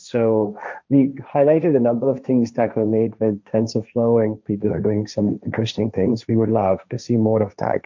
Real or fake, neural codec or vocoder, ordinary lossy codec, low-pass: fake; codec, 16 kHz, 1.1 kbps, Voila-Tokenizer; MP3, 64 kbps; 7.2 kHz